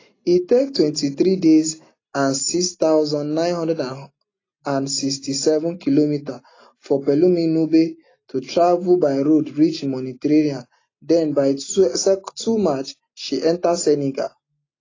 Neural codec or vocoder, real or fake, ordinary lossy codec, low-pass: none; real; AAC, 32 kbps; 7.2 kHz